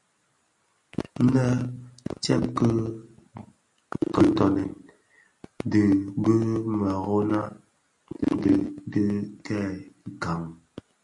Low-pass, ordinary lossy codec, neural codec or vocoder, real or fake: 10.8 kHz; MP3, 64 kbps; none; real